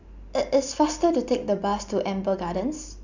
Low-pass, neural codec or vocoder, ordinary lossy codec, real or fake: 7.2 kHz; none; none; real